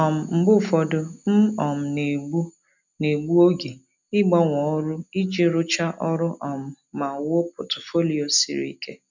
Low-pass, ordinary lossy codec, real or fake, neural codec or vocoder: 7.2 kHz; none; real; none